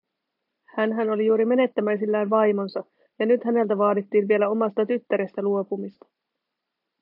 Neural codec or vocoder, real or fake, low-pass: none; real; 5.4 kHz